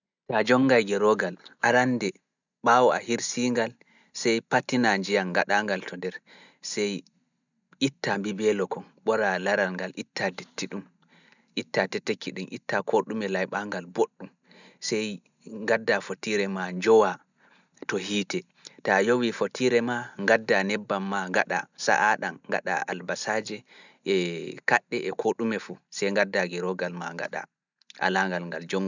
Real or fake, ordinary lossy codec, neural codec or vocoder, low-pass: real; none; none; 7.2 kHz